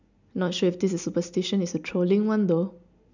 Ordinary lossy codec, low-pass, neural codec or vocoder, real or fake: none; 7.2 kHz; none; real